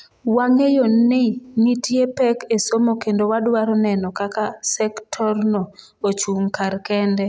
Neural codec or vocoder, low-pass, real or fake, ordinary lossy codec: none; none; real; none